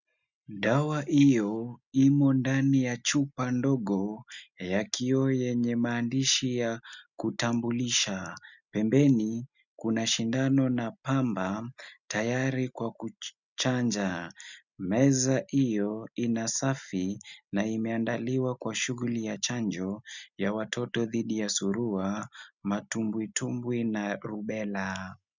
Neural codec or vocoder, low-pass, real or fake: none; 7.2 kHz; real